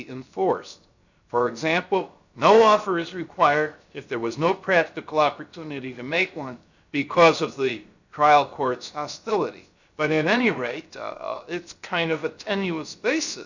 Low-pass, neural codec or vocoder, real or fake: 7.2 kHz; codec, 16 kHz, 0.7 kbps, FocalCodec; fake